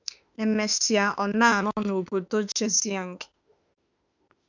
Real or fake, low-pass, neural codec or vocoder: fake; 7.2 kHz; autoencoder, 48 kHz, 32 numbers a frame, DAC-VAE, trained on Japanese speech